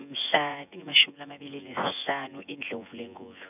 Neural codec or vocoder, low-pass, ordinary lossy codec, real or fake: vocoder, 24 kHz, 100 mel bands, Vocos; 3.6 kHz; none; fake